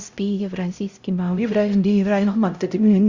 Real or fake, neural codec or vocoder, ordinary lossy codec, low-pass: fake; codec, 16 kHz, 0.5 kbps, X-Codec, HuBERT features, trained on LibriSpeech; Opus, 64 kbps; 7.2 kHz